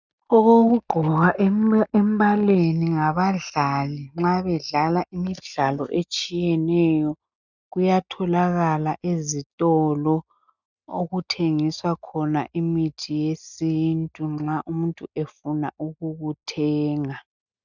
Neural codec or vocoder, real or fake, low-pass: none; real; 7.2 kHz